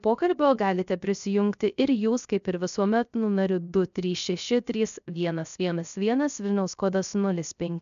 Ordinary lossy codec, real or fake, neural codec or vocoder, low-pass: MP3, 96 kbps; fake; codec, 16 kHz, 0.3 kbps, FocalCodec; 7.2 kHz